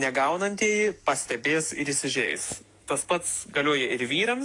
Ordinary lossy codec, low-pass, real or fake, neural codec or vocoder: AAC, 48 kbps; 10.8 kHz; fake; codec, 44.1 kHz, 7.8 kbps, DAC